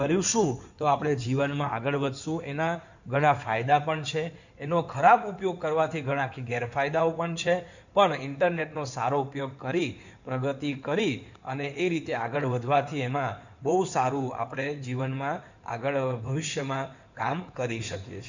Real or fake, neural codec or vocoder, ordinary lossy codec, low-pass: fake; codec, 16 kHz in and 24 kHz out, 2.2 kbps, FireRedTTS-2 codec; none; 7.2 kHz